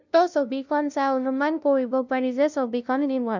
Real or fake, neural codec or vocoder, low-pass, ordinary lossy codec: fake; codec, 16 kHz, 0.5 kbps, FunCodec, trained on LibriTTS, 25 frames a second; 7.2 kHz; none